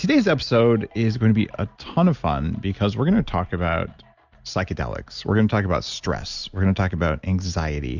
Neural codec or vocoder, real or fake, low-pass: none; real; 7.2 kHz